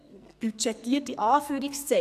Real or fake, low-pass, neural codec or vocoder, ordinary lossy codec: fake; 14.4 kHz; codec, 44.1 kHz, 2.6 kbps, SNAC; none